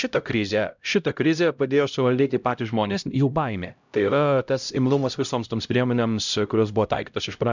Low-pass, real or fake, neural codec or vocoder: 7.2 kHz; fake; codec, 16 kHz, 0.5 kbps, X-Codec, HuBERT features, trained on LibriSpeech